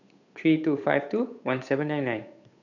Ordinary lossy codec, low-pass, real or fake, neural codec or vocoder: none; 7.2 kHz; fake; codec, 16 kHz, 8 kbps, FunCodec, trained on Chinese and English, 25 frames a second